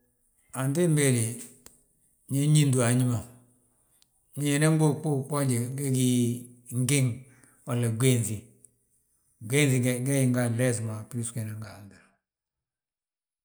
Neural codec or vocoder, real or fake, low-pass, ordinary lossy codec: none; real; none; none